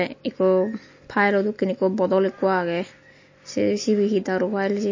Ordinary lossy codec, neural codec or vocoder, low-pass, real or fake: MP3, 32 kbps; none; 7.2 kHz; real